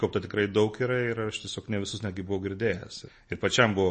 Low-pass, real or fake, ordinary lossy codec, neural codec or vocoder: 10.8 kHz; real; MP3, 32 kbps; none